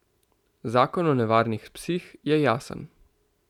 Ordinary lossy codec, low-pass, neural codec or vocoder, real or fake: none; 19.8 kHz; none; real